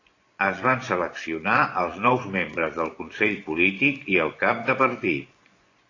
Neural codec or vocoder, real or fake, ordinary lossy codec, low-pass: vocoder, 24 kHz, 100 mel bands, Vocos; fake; AAC, 32 kbps; 7.2 kHz